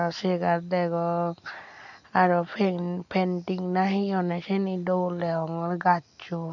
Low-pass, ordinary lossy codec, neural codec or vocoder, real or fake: 7.2 kHz; none; none; real